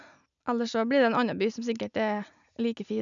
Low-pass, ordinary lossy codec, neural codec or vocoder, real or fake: 7.2 kHz; none; none; real